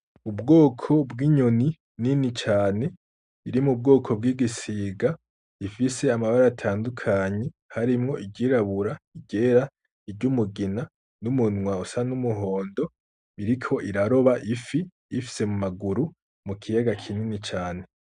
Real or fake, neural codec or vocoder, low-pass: real; none; 9.9 kHz